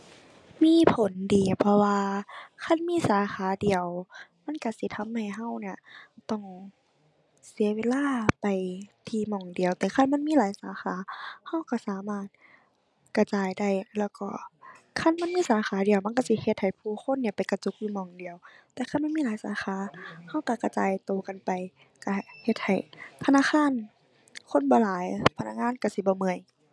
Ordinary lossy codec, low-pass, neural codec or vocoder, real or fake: none; none; none; real